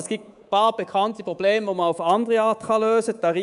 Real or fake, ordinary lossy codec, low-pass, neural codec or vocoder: fake; none; 10.8 kHz; codec, 24 kHz, 3.1 kbps, DualCodec